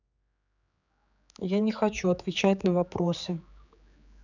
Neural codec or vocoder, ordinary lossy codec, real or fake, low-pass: codec, 16 kHz, 4 kbps, X-Codec, HuBERT features, trained on general audio; none; fake; 7.2 kHz